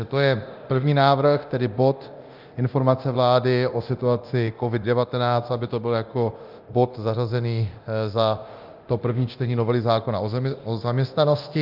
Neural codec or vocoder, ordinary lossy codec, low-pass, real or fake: codec, 24 kHz, 0.9 kbps, DualCodec; Opus, 24 kbps; 5.4 kHz; fake